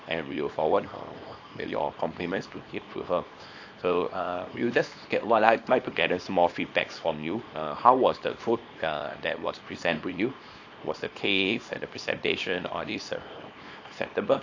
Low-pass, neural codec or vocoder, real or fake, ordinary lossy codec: 7.2 kHz; codec, 24 kHz, 0.9 kbps, WavTokenizer, small release; fake; AAC, 48 kbps